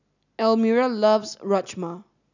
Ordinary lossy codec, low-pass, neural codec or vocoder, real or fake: none; 7.2 kHz; none; real